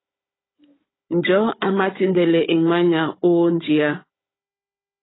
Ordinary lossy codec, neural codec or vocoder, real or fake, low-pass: AAC, 16 kbps; codec, 16 kHz, 16 kbps, FunCodec, trained on Chinese and English, 50 frames a second; fake; 7.2 kHz